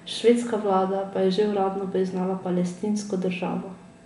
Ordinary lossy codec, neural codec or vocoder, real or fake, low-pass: MP3, 96 kbps; none; real; 10.8 kHz